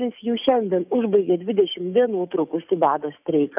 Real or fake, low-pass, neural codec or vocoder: fake; 3.6 kHz; codec, 44.1 kHz, 7.8 kbps, DAC